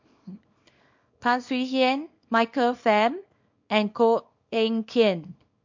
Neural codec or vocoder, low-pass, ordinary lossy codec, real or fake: codec, 24 kHz, 0.9 kbps, WavTokenizer, small release; 7.2 kHz; MP3, 48 kbps; fake